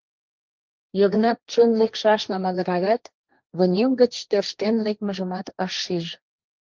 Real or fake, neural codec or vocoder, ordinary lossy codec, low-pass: fake; codec, 16 kHz, 1.1 kbps, Voila-Tokenizer; Opus, 24 kbps; 7.2 kHz